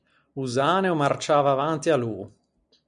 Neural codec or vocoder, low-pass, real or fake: none; 9.9 kHz; real